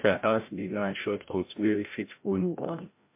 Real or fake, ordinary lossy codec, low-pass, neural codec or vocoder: fake; MP3, 24 kbps; 3.6 kHz; codec, 16 kHz, 0.5 kbps, FreqCodec, larger model